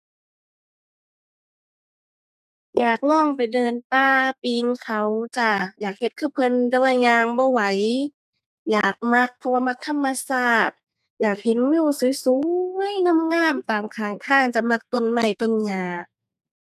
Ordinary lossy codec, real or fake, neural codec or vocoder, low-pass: none; fake; codec, 32 kHz, 1.9 kbps, SNAC; 14.4 kHz